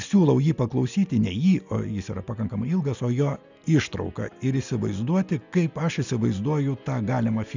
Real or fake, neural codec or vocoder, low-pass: real; none; 7.2 kHz